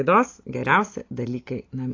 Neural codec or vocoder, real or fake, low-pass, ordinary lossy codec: none; real; 7.2 kHz; AAC, 32 kbps